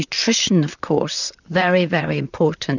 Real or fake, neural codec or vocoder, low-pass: fake; vocoder, 44.1 kHz, 128 mel bands, Pupu-Vocoder; 7.2 kHz